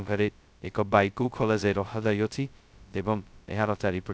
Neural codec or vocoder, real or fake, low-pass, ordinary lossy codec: codec, 16 kHz, 0.2 kbps, FocalCodec; fake; none; none